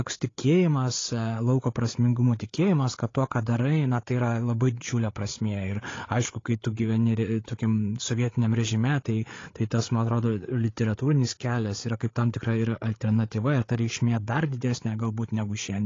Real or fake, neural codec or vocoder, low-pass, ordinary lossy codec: fake; codec, 16 kHz, 8 kbps, FreqCodec, larger model; 7.2 kHz; AAC, 32 kbps